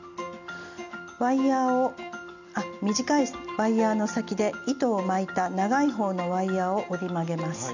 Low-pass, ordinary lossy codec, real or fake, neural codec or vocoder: 7.2 kHz; none; real; none